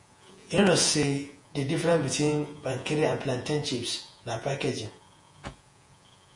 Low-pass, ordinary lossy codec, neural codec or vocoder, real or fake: 10.8 kHz; MP3, 48 kbps; vocoder, 48 kHz, 128 mel bands, Vocos; fake